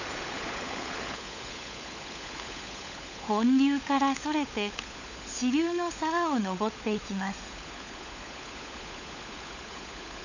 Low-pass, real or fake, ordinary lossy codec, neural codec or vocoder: 7.2 kHz; fake; none; codec, 16 kHz, 16 kbps, FunCodec, trained on LibriTTS, 50 frames a second